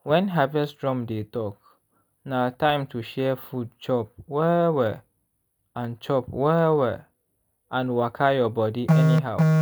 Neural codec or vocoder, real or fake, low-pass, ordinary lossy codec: none; real; none; none